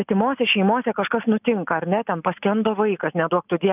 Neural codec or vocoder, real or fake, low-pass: none; real; 3.6 kHz